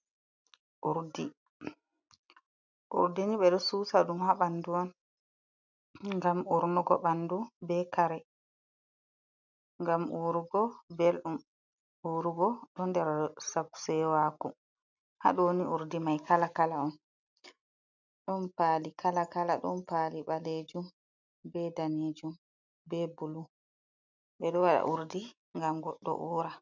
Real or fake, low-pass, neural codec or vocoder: real; 7.2 kHz; none